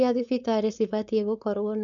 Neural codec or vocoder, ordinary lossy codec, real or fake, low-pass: codec, 16 kHz, 4.8 kbps, FACodec; none; fake; 7.2 kHz